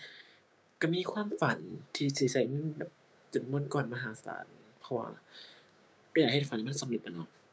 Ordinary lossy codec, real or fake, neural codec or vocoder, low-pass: none; fake; codec, 16 kHz, 6 kbps, DAC; none